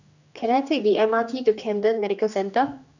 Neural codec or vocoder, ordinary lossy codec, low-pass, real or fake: codec, 16 kHz, 2 kbps, X-Codec, HuBERT features, trained on general audio; none; 7.2 kHz; fake